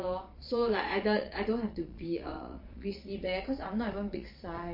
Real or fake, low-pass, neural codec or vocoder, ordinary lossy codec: fake; 5.4 kHz; vocoder, 22.05 kHz, 80 mel bands, Vocos; MP3, 32 kbps